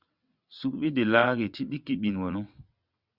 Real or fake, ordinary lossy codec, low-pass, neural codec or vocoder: fake; Opus, 64 kbps; 5.4 kHz; vocoder, 22.05 kHz, 80 mel bands, WaveNeXt